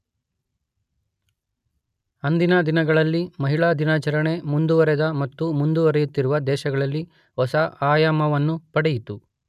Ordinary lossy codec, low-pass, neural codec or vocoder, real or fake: none; 14.4 kHz; none; real